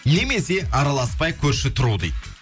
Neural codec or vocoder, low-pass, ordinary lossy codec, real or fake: none; none; none; real